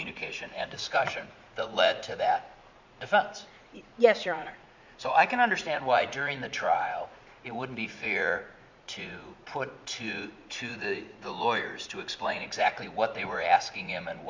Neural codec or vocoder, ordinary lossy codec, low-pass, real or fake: vocoder, 44.1 kHz, 80 mel bands, Vocos; MP3, 64 kbps; 7.2 kHz; fake